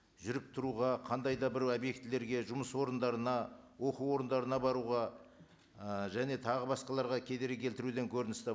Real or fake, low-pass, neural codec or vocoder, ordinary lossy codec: real; none; none; none